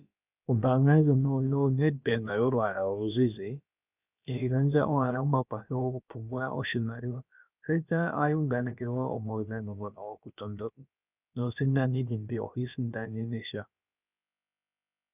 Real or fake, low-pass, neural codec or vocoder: fake; 3.6 kHz; codec, 16 kHz, about 1 kbps, DyCAST, with the encoder's durations